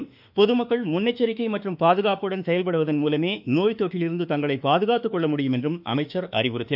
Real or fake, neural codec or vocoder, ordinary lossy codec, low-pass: fake; autoencoder, 48 kHz, 32 numbers a frame, DAC-VAE, trained on Japanese speech; none; 5.4 kHz